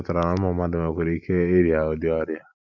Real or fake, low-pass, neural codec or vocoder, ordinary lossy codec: real; 7.2 kHz; none; none